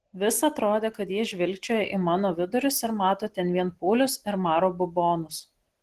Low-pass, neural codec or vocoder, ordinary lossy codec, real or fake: 14.4 kHz; none; Opus, 16 kbps; real